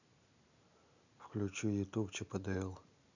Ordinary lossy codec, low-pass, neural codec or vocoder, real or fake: none; 7.2 kHz; none; real